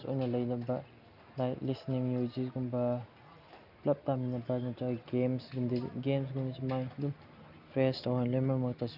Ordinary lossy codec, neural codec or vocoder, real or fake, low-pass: none; none; real; 5.4 kHz